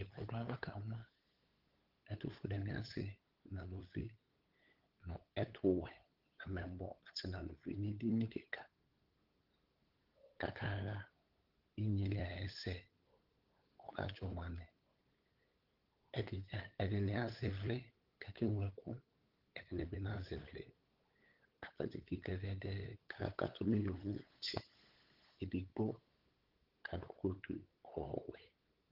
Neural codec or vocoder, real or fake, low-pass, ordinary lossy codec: codec, 16 kHz, 8 kbps, FunCodec, trained on LibriTTS, 25 frames a second; fake; 5.4 kHz; Opus, 16 kbps